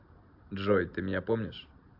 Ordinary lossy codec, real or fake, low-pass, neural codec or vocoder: AAC, 48 kbps; real; 5.4 kHz; none